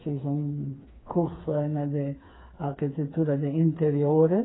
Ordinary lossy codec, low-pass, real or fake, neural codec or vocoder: AAC, 16 kbps; 7.2 kHz; fake; codec, 16 kHz, 4 kbps, FreqCodec, smaller model